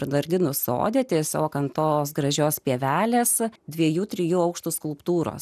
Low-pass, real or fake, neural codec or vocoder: 14.4 kHz; real; none